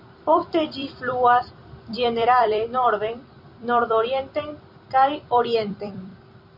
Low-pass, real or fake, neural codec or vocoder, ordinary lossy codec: 5.4 kHz; fake; vocoder, 44.1 kHz, 128 mel bands every 256 samples, BigVGAN v2; MP3, 48 kbps